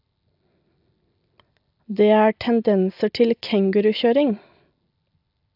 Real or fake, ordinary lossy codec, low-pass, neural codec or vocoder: real; AAC, 48 kbps; 5.4 kHz; none